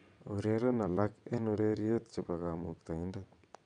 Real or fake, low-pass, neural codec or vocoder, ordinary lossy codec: fake; 9.9 kHz; vocoder, 22.05 kHz, 80 mel bands, WaveNeXt; none